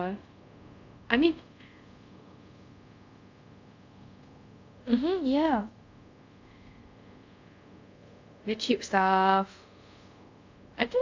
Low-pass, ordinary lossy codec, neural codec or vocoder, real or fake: 7.2 kHz; AAC, 48 kbps; codec, 24 kHz, 0.5 kbps, DualCodec; fake